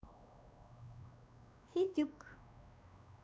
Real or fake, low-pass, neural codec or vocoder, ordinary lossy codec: fake; none; codec, 16 kHz, 2 kbps, X-Codec, WavLM features, trained on Multilingual LibriSpeech; none